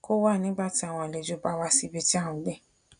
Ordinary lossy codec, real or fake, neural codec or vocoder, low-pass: none; real; none; 9.9 kHz